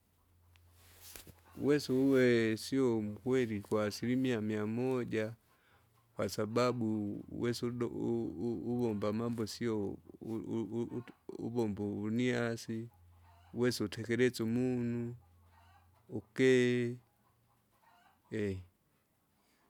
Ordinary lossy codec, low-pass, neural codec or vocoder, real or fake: none; 19.8 kHz; none; real